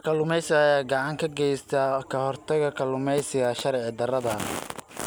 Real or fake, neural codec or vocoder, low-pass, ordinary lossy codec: real; none; none; none